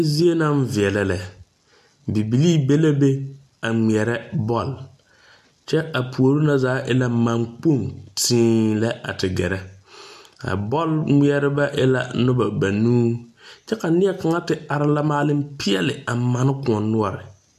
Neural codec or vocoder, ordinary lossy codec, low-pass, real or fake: none; AAC, 96 kbps; 14.4 kHz; real